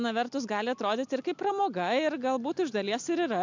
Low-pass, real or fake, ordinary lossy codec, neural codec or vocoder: 7.2 kHz; real; MP3, 64 kbps; none